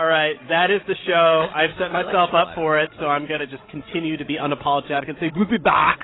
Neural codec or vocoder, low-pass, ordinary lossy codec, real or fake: codec, 16 kHz, 16 kbps, FreqCodec, larger model; 7.2 kHz; AAC, 16 kbps; fake